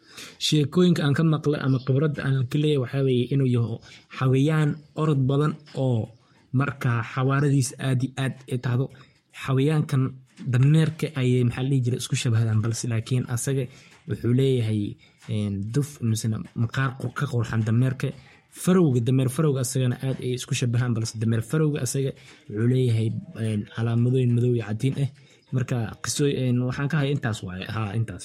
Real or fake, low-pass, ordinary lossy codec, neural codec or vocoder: fake; 19.8 kHz; MP3, 64 kbps; codec, 44.1 kHz, 7.8 kbps, Pupu-Codec